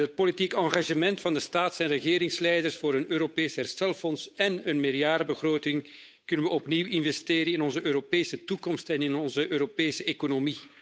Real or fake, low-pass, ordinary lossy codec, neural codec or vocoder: fake; none; none; codec, 16 kHz, 8 kbps, FunCodec, trained on Chinese and English, 25 frames a second